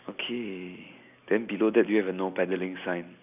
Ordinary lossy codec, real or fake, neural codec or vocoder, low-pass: none; real; none; 3.6 kHz